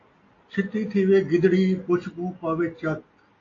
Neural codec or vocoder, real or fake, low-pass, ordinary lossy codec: none; real; 7.2 kHz; AAC, 32 kbps